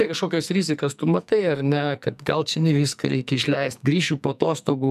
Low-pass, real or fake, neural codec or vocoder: 14.4 kHz; fake; codec, 44.1 kHz, 2.6 kbps, SNAC